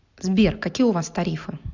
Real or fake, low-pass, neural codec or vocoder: real; 7.2 kHz; none